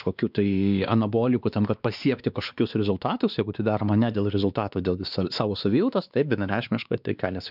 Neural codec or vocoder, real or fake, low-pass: codec, 16 kHz, 2 kbps, X-Codec, WavLM features, trained on Multilingual LibriSpeech; fake; 5.4 kHz